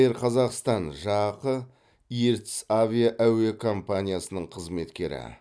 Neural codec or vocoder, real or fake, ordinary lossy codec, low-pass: none; real; none; none